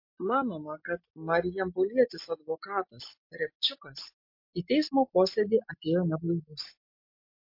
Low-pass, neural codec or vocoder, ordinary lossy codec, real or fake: 5.4 kHz; none; MP3, 32 kbps; real